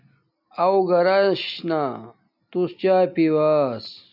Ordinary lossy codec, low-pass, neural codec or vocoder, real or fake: MP3, 48 kbps; 5.4 kHz; none; real